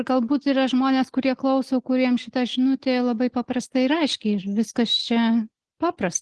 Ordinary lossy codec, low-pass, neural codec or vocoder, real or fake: Opus, 16 kbps; 10.8 kHz; none; real